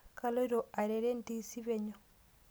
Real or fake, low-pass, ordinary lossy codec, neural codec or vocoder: real; none; none; none